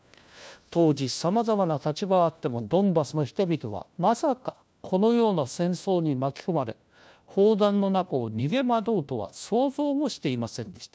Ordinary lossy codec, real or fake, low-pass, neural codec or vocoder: none; fake; none; codec, 16 kHz, 1 kbps, FunCodec, trained on LibriTTS, 50 frames a second